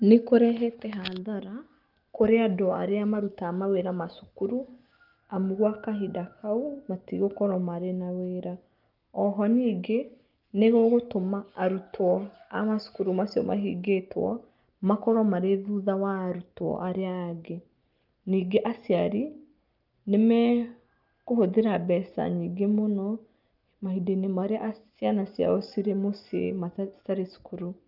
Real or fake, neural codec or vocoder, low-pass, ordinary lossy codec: real; none; 5.4 kHz; Opus, 24 kbps